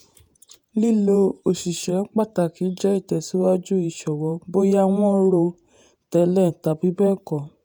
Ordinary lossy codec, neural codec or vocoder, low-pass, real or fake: none; vocoder, 48 kHz, 128 mel bands, Vocos; none; fake